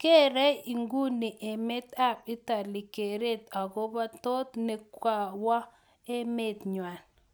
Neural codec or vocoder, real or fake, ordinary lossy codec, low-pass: none; real; none; none